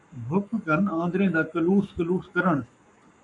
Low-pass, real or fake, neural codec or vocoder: 10.8 kHz; fake; codec, 44.1 kHz, 7.8 kbps, Pupu-Codec